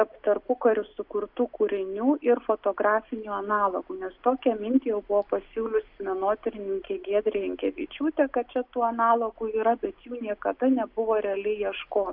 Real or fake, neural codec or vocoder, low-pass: real; none; 5.4 kHz